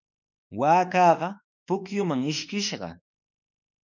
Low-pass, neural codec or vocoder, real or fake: 7.2 kHz; autoencoder, 48 kHz, 32 numbers a frame, DAC-VAE, trained on Japanese speech; fake